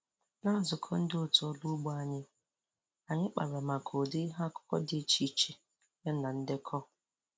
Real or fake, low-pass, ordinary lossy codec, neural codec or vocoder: real; none; none; none